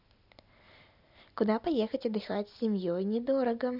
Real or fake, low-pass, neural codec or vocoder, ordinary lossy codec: real; 5.4 kHz; none; none